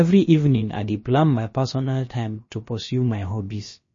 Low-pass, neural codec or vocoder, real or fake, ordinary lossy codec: 7.2 kHz; codec, 16 kHz, about 1 kbps, DyCAST, with the encoder's durations; fake; MP3, 32 kbps